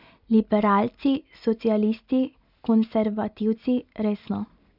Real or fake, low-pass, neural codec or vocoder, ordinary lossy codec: real; 5.4 kHz; none; none